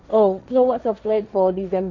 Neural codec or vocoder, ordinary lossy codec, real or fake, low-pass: codec, 16 kHz, 1.1 kbps, Voila-Tokenizer; none; fake; 7.2 kHz